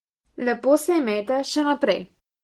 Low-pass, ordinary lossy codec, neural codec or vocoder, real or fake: 19.8 kHz; Opus, 16 kbps; none; real